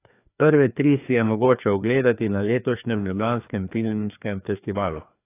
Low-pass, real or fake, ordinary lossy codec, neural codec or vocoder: 3.6 kHz; fake; AAC, 24 kbps; codec, 16 kHz, 2 kbps, FreqCodec, larger model